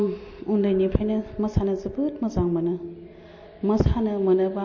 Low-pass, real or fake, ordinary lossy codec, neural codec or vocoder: 7.2 kHz; real; MP3, 32 kbps; none